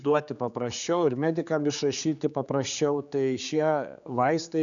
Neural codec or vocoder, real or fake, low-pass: codec, 16 kHz, 4 kbps, X-Codec, HuBERT features, trained on general audio; fake; 7.2 kHz